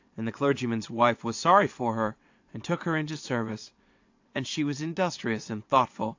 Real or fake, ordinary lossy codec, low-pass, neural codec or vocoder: fake; AAC, 48 kbps; 7.2 kHz; vocoder, 22.05 kHz, 80 mel bands, WaveNeXt